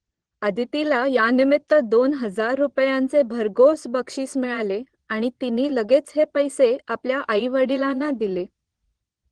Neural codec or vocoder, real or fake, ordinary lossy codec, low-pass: vocoder, 22.05 kHz, 80 mel bands, Vocos; fake; Opus, 16 kbps; 9.9 kHz